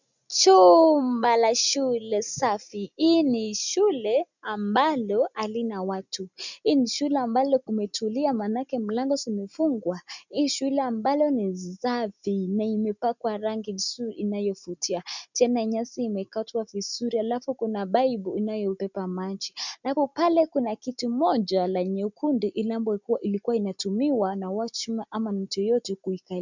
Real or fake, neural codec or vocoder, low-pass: real; none; 7.2 kHz